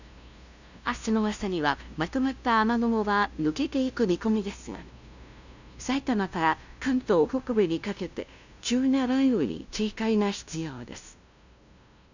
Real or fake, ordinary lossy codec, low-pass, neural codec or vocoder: fake; none; 7.2 kHz; codec, 16 kHz, 0.5 kbps, FunCodec, trained on LibriTTS, 25 frames a second